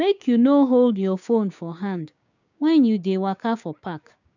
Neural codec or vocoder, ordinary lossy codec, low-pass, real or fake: codec, 16 kHz, 6 kbps, DAC; none; 7.2 kHz; fake